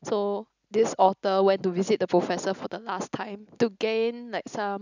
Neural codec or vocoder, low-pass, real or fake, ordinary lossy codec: none; 7.2 kHz; real; none